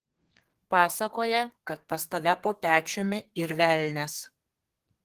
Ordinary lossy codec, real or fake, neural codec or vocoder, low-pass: Opus, 16 kbps; fake; codec, 32 kHz, 1.9 kbps, SNAC; 14.4 kHz